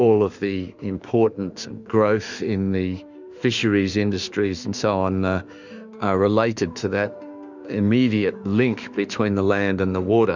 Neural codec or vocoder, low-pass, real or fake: autoencoder, 48 kHz, 32 numbers a frame, DAC-VAE, trained on Japanese speech; 7.2 kHz; fake